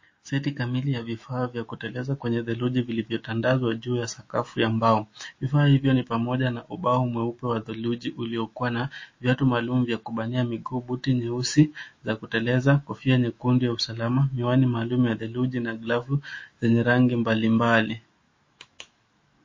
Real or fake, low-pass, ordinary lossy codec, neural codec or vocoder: fake; 7.2 kHz; MP3, 32 kbps; autoencoder, 48 kHz, 128 numbers a frame, DAC-VAE, trained on Japanese speech